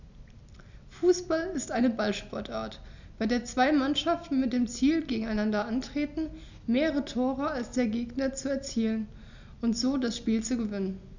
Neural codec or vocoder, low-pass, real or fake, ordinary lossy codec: none; 7.2 kHz; real; none